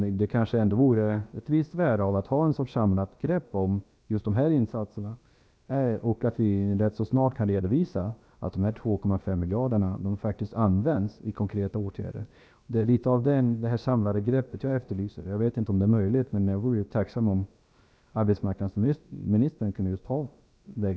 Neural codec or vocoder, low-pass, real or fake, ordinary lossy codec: codec, 16 kHz, about 1 kbps, DyCAST, with the encoder's durations; none; fake; none